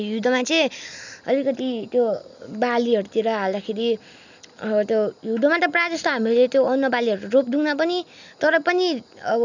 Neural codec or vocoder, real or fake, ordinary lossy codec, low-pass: none; real; none; 7.2 kHz